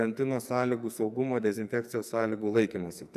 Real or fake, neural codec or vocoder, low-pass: fake; codec, 44.1 kHz, 2.6 kbps, SNAC; 14.4 kHz